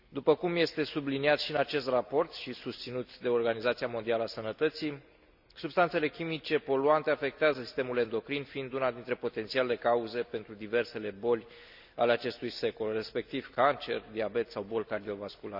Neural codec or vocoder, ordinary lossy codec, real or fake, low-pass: none; none; real; 5.4 kHz